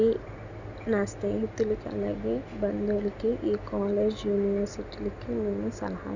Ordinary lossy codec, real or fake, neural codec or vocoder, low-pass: none; real; none; 7.2 kHz